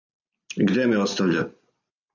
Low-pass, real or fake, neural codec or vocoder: 7.2 kHz; real; none